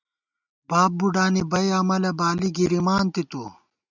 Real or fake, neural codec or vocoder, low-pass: real; none; 7.2 kHz